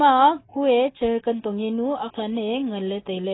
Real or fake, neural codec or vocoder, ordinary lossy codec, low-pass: real; none; AAC, 16 kbps; 7.2 kHz